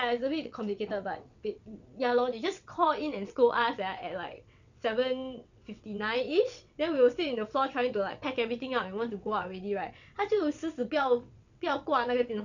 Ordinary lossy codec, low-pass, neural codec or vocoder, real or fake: none; 7.2 kHz; vocoder, 22.05 kHz, 80 mel bands, WaveNeXt; fake